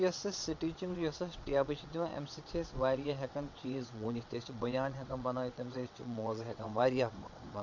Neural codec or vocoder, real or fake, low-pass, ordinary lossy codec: vocoder, 22.05 kHz, 80 mel bands, WaveNeXt; fake; 7.2 kHz; none